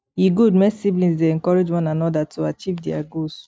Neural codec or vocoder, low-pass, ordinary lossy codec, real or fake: none; none; none; real